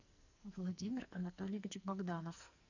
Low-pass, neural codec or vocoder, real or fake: 7.2 kHz; codec, 44.1 kHz, 2.6 kbps, SNAC; fake